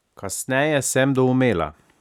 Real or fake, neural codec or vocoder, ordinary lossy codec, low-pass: real; none; none; 19.8 kHz